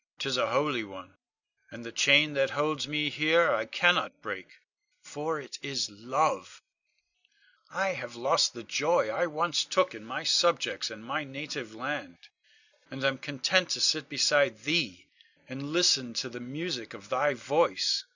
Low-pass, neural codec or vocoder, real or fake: 7.2 kHz; none; real